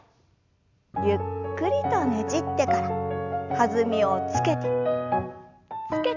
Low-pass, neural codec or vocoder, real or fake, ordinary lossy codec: 7.2 kHz; none; real; none